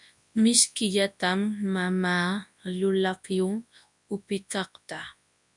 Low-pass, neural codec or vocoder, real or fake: 10.8 kHz; codec, 24 kHz, 0.9 kbps, WavTokenizer, large speech release; fake